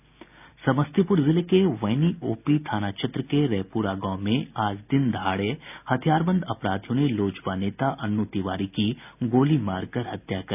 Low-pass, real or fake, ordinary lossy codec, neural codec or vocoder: 3.6 kHz; real; none; none